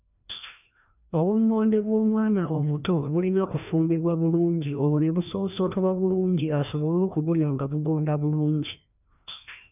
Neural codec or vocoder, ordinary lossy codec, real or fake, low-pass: codec, 16 kHz, 1 kbps, FreqCodec, larger model; none; fake; 3.6 kHz